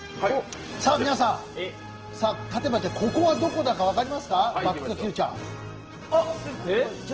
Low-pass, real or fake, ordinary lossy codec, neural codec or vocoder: 7.2 kHz; real; Opus, 16 kbps; none